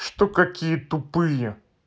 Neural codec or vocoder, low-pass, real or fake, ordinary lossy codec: none; none; real; none